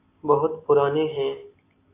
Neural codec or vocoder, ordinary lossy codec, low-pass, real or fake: none; AAC, 24 kbps; 3.6 kHz; real